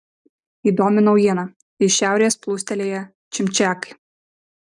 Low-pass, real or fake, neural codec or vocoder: 10.8 kHz; real; none